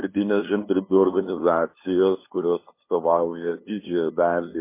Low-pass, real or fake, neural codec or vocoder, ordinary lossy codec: 3.6 kHz; fake; codec, 16 kHz, 2 kbps, FunCodec, trained on LibriTTS, 25 frames a second; MP3, 24 kbps